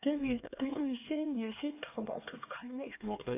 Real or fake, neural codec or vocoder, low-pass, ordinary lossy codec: fake; codec, 16 kHz, 2 kbps, X-Codec, HuBERT features, trained on LibriSpeech; 3.6 kHz; none